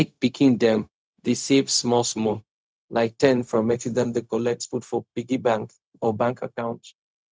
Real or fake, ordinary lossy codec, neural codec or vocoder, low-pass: fake; none; codec, 16 kHz, 0.4 kbps, LongCat-Audio-Codec; none